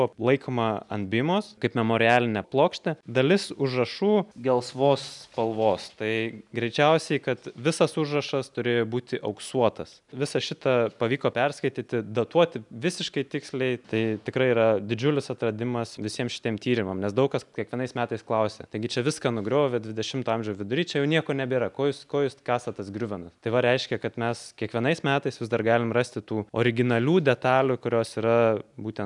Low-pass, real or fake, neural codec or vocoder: 10.8 kHz; real; none